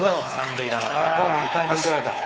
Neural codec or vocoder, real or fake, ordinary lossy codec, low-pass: codec, 16 kHz, 4 kbps, X-Codec, WavLM features, trained on Multilingual LibriSpeech; fake; none; none